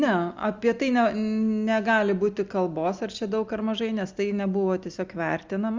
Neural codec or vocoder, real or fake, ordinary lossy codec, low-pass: none; real; Opus, 32 kbps; 7.2 kHz